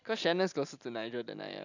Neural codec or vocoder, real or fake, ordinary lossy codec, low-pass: none; real; none; 7.2 kHz